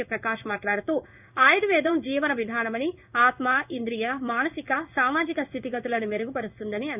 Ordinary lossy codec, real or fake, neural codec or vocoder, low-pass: none; fake; codec, 16 kHz in and 24 kHz out, 1 kbps, XY-Tokenizer; 3.6 kHz